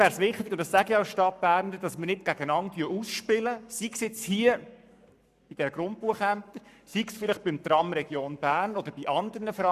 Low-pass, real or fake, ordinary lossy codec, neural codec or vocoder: 14.4 kHz; fake; none; codec, 44.1 kHz, 7.8 kbps, Pupu-Codec